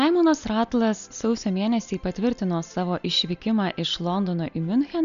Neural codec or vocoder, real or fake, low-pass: none; real; 7.2 kHz